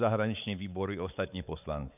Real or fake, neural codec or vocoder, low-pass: fake; codec, 16 kHz, 4 kbps, X-Codec, WavLM features, trained on Multilingual LibriSpeech; 3.6 kHz